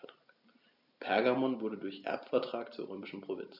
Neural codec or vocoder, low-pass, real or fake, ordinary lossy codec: none; 5.4 kHz; real; none